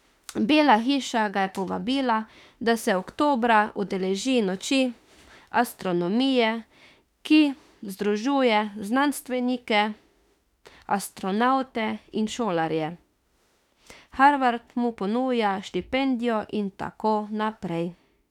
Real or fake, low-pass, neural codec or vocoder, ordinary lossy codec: fake; 19.8 kHz; autoencoder, 48 kHz, 32 numbers a frame, DAC-VAE, trained on Japanese speech; none